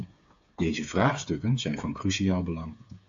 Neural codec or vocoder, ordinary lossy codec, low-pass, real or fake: codec, 16 kHz, 8 kbps, FreqCodec, smaller model; AAC, 64 kbps; 7.2 kHz; fake